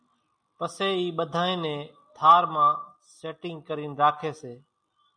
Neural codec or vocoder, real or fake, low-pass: none; real; 9.9 kHz